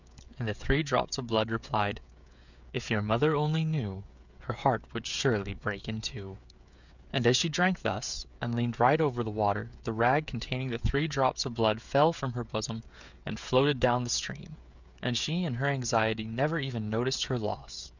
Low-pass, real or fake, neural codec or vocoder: 7.2 kHz; fake; codec, 16 kHz, 16 kbps, FreqCodec, smaller model